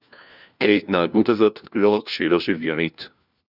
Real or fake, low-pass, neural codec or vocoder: fake; 5.4 kHz; codec, 16 kHz, 1 kbps, FunCodec, trained on LibriTTS, 50 frames a second